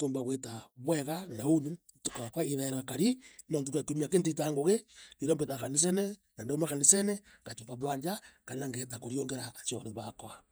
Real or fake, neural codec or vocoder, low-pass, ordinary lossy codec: fake; vocoder, 48 kHz, 128 mel bands, Vocos; none; none